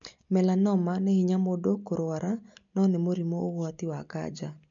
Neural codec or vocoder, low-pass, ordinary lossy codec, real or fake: none; 7.2 kHz; MP3, 64 kbps; real